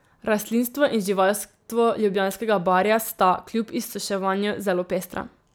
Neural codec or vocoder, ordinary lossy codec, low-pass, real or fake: none; none; none; real